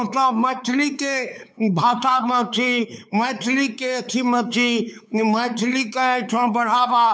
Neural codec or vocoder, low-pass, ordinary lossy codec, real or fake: codec, 16 kHz, 4 kbps, X-Codec, WavLM features, trained on Multilingual LibriSpeech; none; none; fake